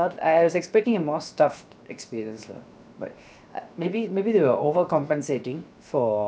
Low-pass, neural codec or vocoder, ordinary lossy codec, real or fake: none; codec, 16 kHz, 0.7 kbps, FocalCodec; none; fake